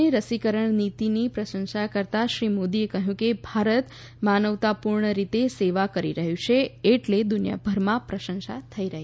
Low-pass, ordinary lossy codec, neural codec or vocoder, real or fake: none; none; none; real